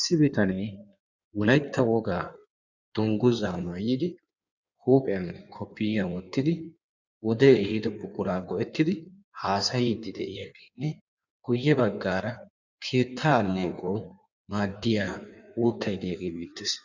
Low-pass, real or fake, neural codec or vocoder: 7.2 kHz; fake; codec, 16 kHz in and 24 kHz out, 1.1 kbps, FireRedTTS-2 codec